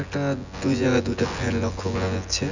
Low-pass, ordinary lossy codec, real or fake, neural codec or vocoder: 7.2 kHz; none; fake; vocoder, 24 kHz, 100 mel bands, Vocos